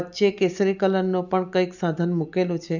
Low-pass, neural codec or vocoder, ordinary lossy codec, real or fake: 7.2 kHz; none; none; real